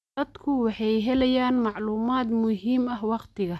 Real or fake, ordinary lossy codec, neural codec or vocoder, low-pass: real; none; none; none